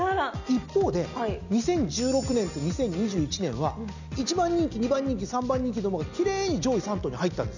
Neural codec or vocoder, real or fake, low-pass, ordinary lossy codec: none; real; 7.2 kHz; none